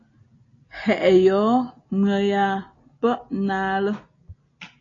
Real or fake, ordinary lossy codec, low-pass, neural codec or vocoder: real; AAC, 32 kbps; 7.2 kHz; none